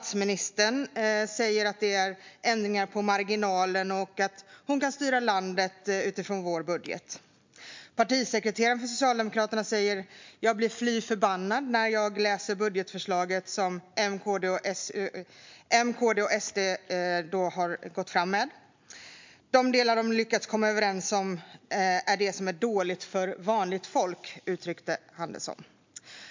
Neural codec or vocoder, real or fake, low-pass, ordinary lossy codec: none; real; 7.2 kHz; MP3, 64 kbps